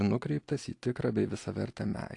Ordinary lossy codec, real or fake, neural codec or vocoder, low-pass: AAC, 48 kbps; fake; vocoder, 44.1 kHz, 128 mel bands every 256 samples, BigVGAN v2; 10.8 kHz